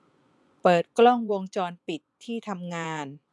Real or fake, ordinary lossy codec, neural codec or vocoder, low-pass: fake; none; vocoder, 24 kHz, 100 mel bands, Vocos; none